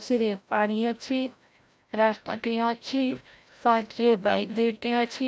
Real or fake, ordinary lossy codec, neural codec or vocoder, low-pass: fake; none; codec, 16 kHz, 0.5 kbps, FreqCodec, larger model; none